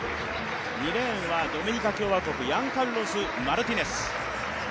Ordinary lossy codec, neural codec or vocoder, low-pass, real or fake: none; none; none; real